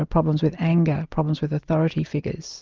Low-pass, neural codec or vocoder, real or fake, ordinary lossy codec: 7.2 kHz; none; real; Opus, 24 kbps